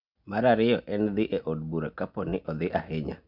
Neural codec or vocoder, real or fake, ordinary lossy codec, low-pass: none; real; none; 5.4 kHz